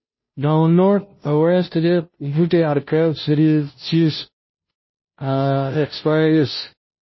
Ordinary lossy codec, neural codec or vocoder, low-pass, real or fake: MP3, 24 kbps; codec, 16 kHz, 0.5 kbps, FunCodec, trained on Chinese and English, 25 frames a second; 7.2 kHz; fake